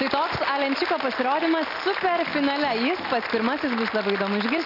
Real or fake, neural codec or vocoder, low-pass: real; none; 5.4 kHz